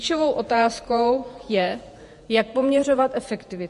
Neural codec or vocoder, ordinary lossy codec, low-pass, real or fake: vocoder, 48 kHz, 128 mel bands, Vocos; MP3, 48 kbps; 14.4 kHz; fake